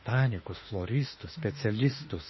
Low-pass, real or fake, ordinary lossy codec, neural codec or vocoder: 7.2 kHz; fake; MP3, 24 kbps; autoencoder, 48 kHz, 32 numbers a frame, DAC-VAE, trained on Japanese speech